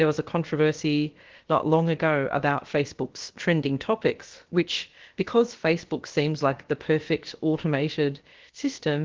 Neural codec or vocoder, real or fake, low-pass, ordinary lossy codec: codec, 16 kHz, about 1 kbps, DyCAST, with the encoder's durations; fake; 7.2 kHz; Opus, 16 kbps